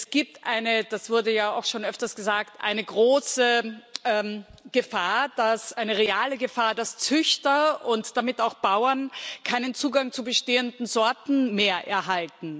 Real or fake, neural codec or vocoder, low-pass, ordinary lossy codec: real; none; none; none